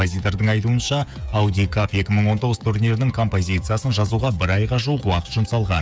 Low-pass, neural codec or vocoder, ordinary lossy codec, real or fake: none; codec, 16 kHz, 16 kbps, FreqCodec, smaller model; none; fake